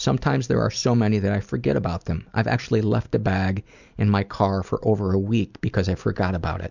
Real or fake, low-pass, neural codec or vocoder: fake; 7.2 kHz; vocoder, 44.1 kHz, 128 mel bands every 256 samples, BigVGAN v2